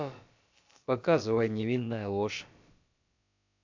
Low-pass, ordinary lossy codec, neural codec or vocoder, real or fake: 7.2 kHz; AAC, 48 kbps; codec, 16 kHz, about 1 kbps, DyCAST, with the encoder's durations; fake